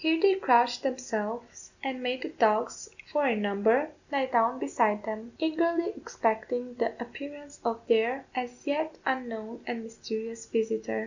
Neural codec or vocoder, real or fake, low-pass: none; real; 7.2 kHz